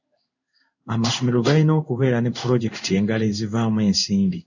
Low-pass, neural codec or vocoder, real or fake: 7.2 kHz; codec, 16 kHz in and 24 kHz out, 1 kbps, XY-Tokenizer; fake